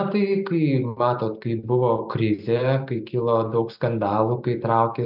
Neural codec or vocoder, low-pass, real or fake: none; 5.4 kHz; real